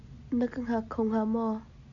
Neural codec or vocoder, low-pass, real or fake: none; 7.2 kHz; real